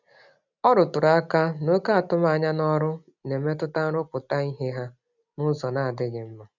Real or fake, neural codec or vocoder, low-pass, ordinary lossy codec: real; none; 7.2 kHz; none